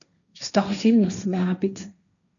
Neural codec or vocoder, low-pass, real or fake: codec, 16 kHz, 1.1 kbps, Voila-Tokenizer; 7.2 kHz; fake